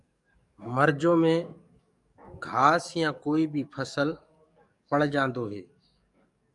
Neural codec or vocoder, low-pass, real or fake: codec, 44.1 kHz, 7.8 kbps, DAC; 10.8 kHz; fake